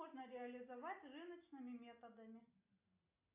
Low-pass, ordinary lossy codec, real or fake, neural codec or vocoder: 3.6 kHz; AAC, 24 kbps; real; none